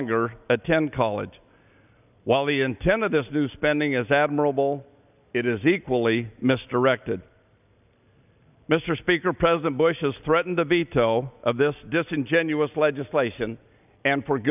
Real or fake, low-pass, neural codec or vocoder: real; 3.6 kHz; none